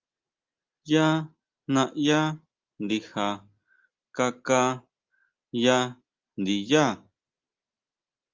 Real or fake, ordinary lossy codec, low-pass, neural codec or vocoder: real; Opus, 32 kbps; 7.2 kHz; none